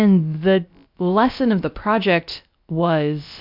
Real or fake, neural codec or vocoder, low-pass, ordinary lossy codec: fake; codec, 16 kHz, about 1 kbps, DyCAST, with the encoder's durations; 5.4 kHz; MP3, 48 kbps